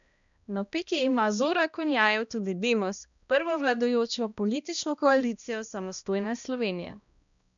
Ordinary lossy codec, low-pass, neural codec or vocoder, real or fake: none; 7.2 kHz; codec, 16 kHz, 1 kbps, X-Codec, HuBERT features, trained on balanced general audio; fake